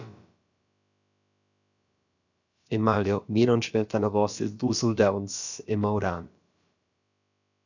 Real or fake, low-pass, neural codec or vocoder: fake; 7.2 kHz; codec, 16 kHz, about 1 kbps, DyCAST, with the encoder's durations